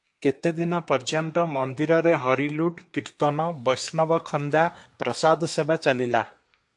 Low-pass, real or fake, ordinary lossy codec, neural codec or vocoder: 10.8 kHz; fake; AAC, 64 kbps; codec, 24 kHz, 1 kbps, SNAC